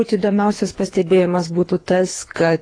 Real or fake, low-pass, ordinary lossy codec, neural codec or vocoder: fake; 9.9 kHz; AAC, 32 kbps; codec, 24 kHz, 3 kbps, HILCodec